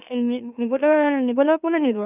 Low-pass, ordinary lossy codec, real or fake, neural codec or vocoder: 3.6 kHz; none; fake; autoencoder, 44.1 kHz, a latent of 192 numbers a frame, MeloTTS